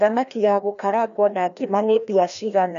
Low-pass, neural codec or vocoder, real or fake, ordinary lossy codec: 7.2 kHz; codec, 16 kHz, 1 kbps, FreqCodec, larger model; fake; none